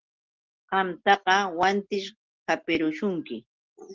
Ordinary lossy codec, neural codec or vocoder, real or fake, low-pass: Opus, 16 kbps; none; real; 7.2 kHz